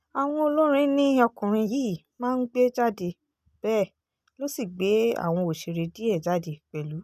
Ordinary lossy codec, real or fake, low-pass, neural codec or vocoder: none; real; 14.4 kHz; none